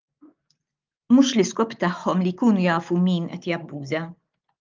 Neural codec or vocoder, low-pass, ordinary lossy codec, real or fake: none; 7.2 kHz; Opus, 24 kbps; real